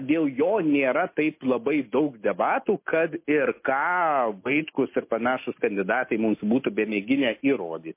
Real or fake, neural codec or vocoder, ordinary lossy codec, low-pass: real; none; MP3, 24 kbps; 3.6 kHz